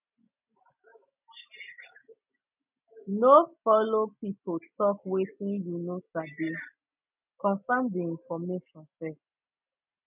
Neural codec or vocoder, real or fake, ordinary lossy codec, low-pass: none; real; none; 3.6 kHz